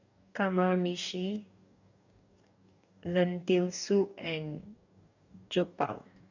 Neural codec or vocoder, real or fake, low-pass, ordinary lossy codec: codec, 44.1 kHz, 2.6 kbps, DAC; fake; 7.2 kHz; none